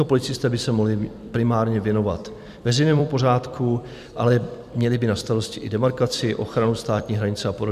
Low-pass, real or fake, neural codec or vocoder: 14.4 kHz; fake; vocoder, 44.1 kHz, 128 mel bands every 256 samples, BigVGAN v2